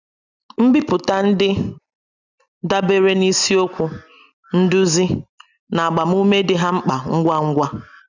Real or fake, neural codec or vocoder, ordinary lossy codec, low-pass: real; none; none; 7.2 kHz